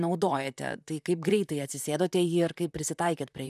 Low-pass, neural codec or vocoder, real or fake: 14.4 kHz; vocoder, 44.1 kHz, 128 mel bands, Pupu-Vocoder; fake